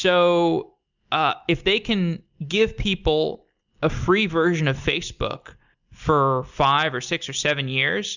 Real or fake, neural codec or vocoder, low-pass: real; none; 7.2 kHz